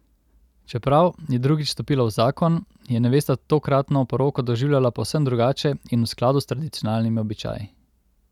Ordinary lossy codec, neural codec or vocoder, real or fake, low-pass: none; none; real; 19.8 kHz